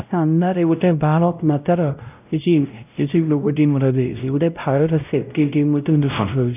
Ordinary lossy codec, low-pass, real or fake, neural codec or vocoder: none; 3.6 kHz; fake; codec, 16 kHz, 0.5 kbps, X-Codec, WavLM features, trained on Multilingual LibriSpeech